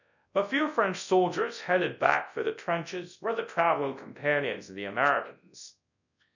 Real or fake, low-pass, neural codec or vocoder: fake; 7.2 kHz; codec, 24 kHz, 0.9 kbps, WavTokenizer, large speech release